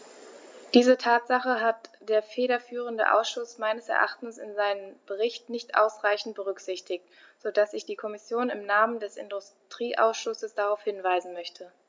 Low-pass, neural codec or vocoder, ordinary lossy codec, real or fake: none; none; none; real